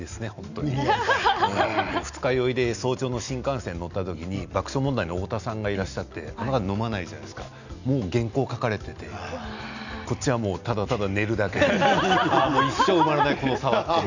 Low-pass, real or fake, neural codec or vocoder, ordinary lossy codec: 7.2 kHz; real; none; none